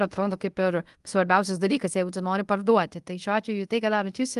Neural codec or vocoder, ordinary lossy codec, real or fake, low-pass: codec, 24 kHz, 0.5 kbps, DualCodec; Opus, 24 kbps; fake; 10.8 kHz